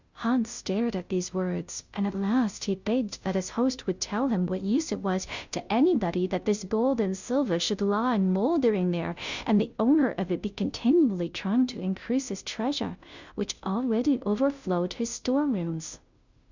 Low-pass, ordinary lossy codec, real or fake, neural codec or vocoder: 7.2 kHz; Opus, 64 kbps; fake; codec, 16 kHz, 0.5 kbps, FunCodec, trained on Chinese and English, 25 frames a second